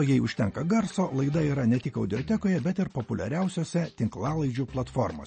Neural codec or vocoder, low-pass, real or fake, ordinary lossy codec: none; 10.8 kHz; real; MP3, 32 kbps